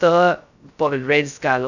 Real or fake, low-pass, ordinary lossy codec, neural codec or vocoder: fake; 7.2 kHz; none; codec, 16 kHz, 0.2 kbps, FocalCodec